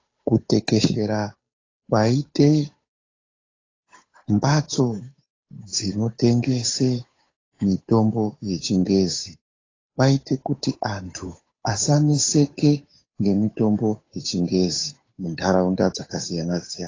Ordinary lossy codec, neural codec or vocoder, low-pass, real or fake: AAC, 32 kbps; codec, 16 kHz, 8 kbps, FunCodec, trained on Chinese and English, 25 frames a second; 7.2 kHz; fake